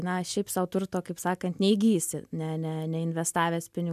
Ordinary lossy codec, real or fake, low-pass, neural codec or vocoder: MP3, 96 kbps; real; 14.4 kHz; none